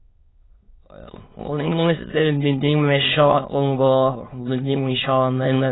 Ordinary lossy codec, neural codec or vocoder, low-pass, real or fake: AAC, 16 kbps; autoencoder, 22.05 kHz, a latent of 192 numbers a frame, VITS, trained on many speakers; 7.2 kHz; fake